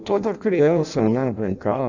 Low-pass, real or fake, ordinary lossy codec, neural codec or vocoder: 7.2 kHz; fake; none; codec, 16 kHz in and 24 kHz out, 0.6 kbps, FireRedTTS-2 codec